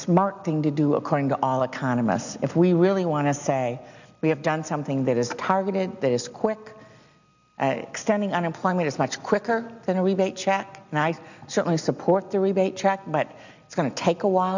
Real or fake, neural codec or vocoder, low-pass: real; none; 7.2 kHz